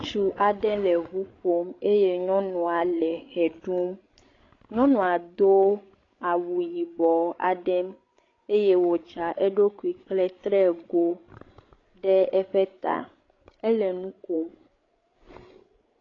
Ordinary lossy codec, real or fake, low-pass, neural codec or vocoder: AAC, 32 kbps; fake; 7.2 kHz; codec, 16 kHz, 16 kbps, FreqCodec, larger model